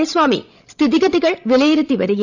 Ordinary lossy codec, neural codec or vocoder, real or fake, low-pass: none; codec, 16 kHz, 16 kbps, FreqCodec, larger model; fake; 7.2 kHz